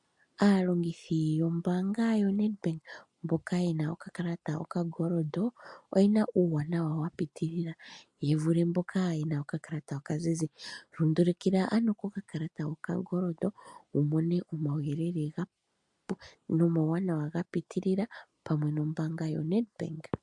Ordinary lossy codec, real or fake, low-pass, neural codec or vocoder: MP3, 64 kbps; real; 10.8 kHz; none